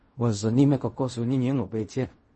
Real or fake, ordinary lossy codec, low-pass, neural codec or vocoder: fake; MP3, 32 kbps; 10.8 kHz; codec, 16 kHz in and 24 kHz out, 0.4 kbps, LongCat-Audio-Codec, fine tuned four codebook decoder